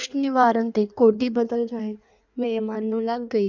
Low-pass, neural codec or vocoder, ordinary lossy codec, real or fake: 7.2 kHz; codec, 16 kHz in and 24 kHz out, 1.1 kbps, FireRedTTS-2 codec; none; fake